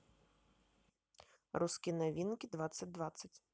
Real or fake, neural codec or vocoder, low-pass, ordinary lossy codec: real; none; none; none